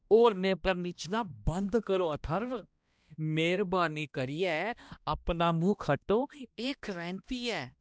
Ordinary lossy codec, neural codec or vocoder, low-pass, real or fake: none; codec, 16 kHz, 1 kbps, X-Codec, HuBERT features, trained on balanced general audio; none; fake